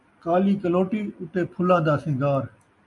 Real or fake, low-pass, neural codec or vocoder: real; 10.8 kHz; none